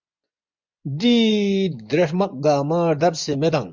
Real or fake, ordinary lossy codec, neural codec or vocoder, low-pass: fake; MP3, 64 kbps; vocoder, 24 kHz, 100 mel bands, Vocos; 7.2 kHz